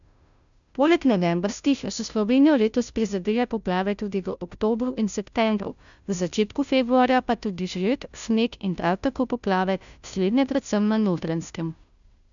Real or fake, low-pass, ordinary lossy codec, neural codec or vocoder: fake; 7.2 kHz; none; codec, 16 kHz, 0.5 kbps, FunCodec, trained on Chinese and English, 25 frames a second